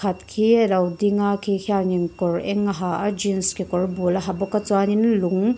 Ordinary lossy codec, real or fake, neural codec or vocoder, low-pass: none; real; none; none